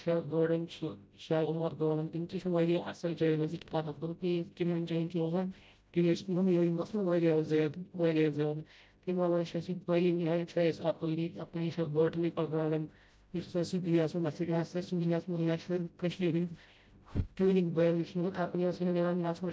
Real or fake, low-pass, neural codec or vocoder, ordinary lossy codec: fake; none; codec, 16 kHz, 0.5 kbps, FreqCodec, smaller model; none